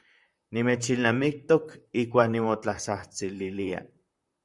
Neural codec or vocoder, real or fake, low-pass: vocoder, 44.1 kHz, 128 mel bands, Pupu-Vocoder; fake; 10.8 kHz